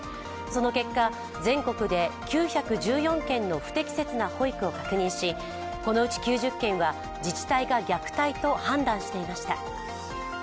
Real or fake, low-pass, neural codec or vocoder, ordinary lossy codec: real; none; none; none